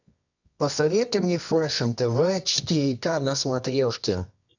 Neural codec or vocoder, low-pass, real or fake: codec, 24 kHz, 0.9 kbps, WavTokenizer, medium music audio release; 7.2 kHz; fake